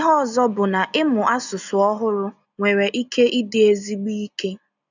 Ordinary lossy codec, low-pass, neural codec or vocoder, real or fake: none; 7.2 kHz; none; real